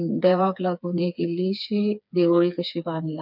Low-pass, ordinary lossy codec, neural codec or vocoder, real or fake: 5.4 kHz; none; codec, 16 kHz, 4 kbps, FreqCodec, smaller model; fake